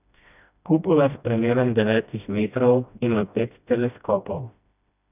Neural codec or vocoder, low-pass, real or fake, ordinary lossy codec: codec, 16 kHz, 1 kbps, FreqCodec, smaller model; 3.6 kHz; fake; none